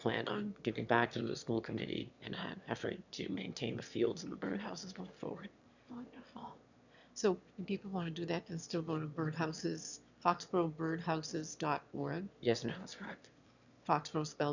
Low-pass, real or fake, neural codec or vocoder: 7.2 kHz; fake; autoencoder, 22.05 kHz, a latent of 192 numbers a frame, VITS, trained on one speaker